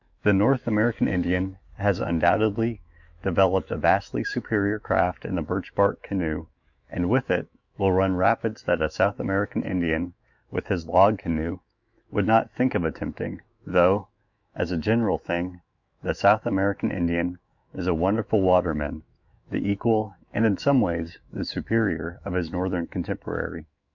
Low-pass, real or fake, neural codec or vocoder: 7.2 kHz; fake; autoencoder, 48 kHz, 128 numbers a frame, DAC-VAE, trained on Japanese speech